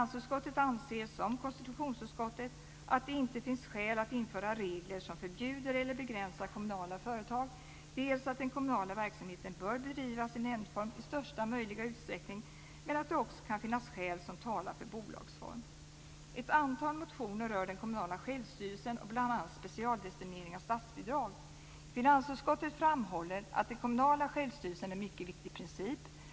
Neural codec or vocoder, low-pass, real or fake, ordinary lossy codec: none; none; real; none